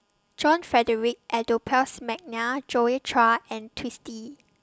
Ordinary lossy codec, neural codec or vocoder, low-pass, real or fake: none; none; none; real